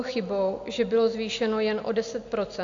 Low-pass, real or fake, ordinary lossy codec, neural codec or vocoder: 7.2 kHz; real; AAC, 64 kbps; none